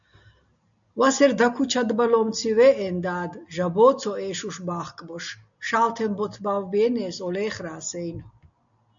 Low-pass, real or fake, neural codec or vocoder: 7.2 kHz; real; none